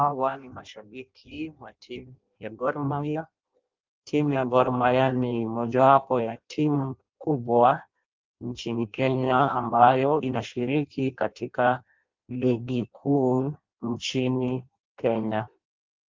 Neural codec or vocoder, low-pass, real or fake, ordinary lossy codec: codec, 16 kHz in and 24 kHz out, 0.6 kbps, FireRedTTS-2 codec; 7.2 kHz; fake; Opus, 24 kbps